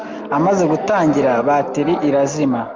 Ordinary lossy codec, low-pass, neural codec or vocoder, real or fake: Opus, 32 kbps; 7.2 kHz; none; real